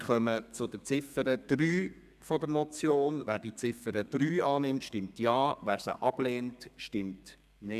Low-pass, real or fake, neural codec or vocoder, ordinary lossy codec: 14.4 kHz; fake; codec, 32 kHz, 1.9 kbps, SNAC; none